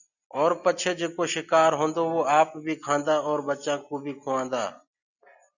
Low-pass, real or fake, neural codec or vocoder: 7.2 kHz; real; none